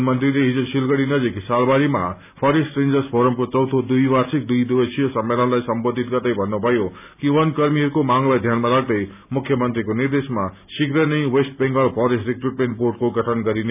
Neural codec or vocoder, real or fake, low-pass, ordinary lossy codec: none; real; 3.6 kHz; none